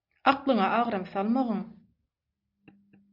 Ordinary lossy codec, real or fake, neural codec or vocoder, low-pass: MP3, 48 kbps; real; none; 5.4 kHz